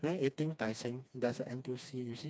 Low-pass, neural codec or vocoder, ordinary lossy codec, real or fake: none; codec, 16 kHz, 2 kbps, FreqCodec, smaller model; none; fake